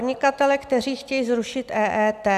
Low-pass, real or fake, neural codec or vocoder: 14.4 kHz; real; none